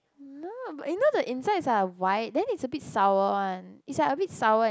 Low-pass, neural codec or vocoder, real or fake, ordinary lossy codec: none; none; real; none